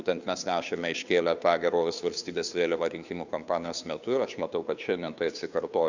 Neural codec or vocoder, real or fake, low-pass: codec, 16 kHz, 2 kbps, FunCodec, trained on Chinese and English, 25 frames a second; fake; 7.2 kHz